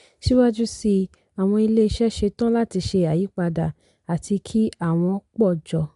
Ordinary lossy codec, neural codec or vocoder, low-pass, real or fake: MP3, 64 kbps; none; 10.8 kHz; real